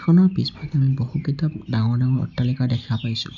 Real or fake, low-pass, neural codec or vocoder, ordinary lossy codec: real; 7.2 kHz; none; none